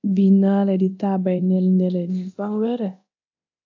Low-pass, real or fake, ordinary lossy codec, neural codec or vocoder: 7.2 kHz; fake; AAC, 48 kbps; codec, 24 kHz, 0.9 kbps, DualCodec